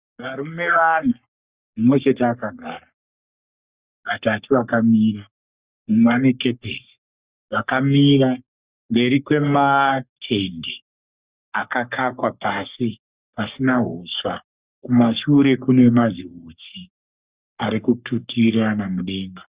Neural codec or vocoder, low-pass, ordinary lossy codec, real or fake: codec, 44.1 kHz, 3.4 kbps, Pupu-Codec; 3.6 kHz; Opus, 64 kbps; fake